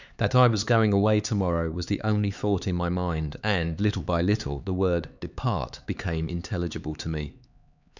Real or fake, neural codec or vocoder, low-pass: fake; codec, 16 kHz, 4 kbps, X-Codec, HuBERT features, trained on LibriSpeech; 7.2 kHz